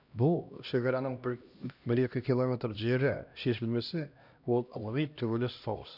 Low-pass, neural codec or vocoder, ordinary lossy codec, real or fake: 5.4 kHz; codec, 16 kHz, 1 kbps, X-Codec, HuBERT features, trained on LibriSpeech; none; fake